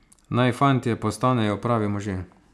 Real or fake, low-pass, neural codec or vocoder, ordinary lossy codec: real; none; none; none